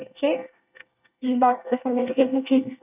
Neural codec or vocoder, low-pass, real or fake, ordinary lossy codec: codec, 24 kHz, 1 kbps, SNAC; 3.6 kHz; fake; none